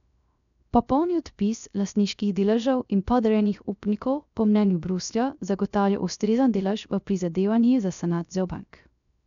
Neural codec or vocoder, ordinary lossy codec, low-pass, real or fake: codec, 16 kHz, 0.3 kbps, FocalCodec; none; 7.2 kHz; fake